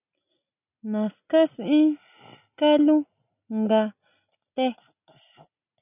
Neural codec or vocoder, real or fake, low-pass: none; real; 3.6 kHz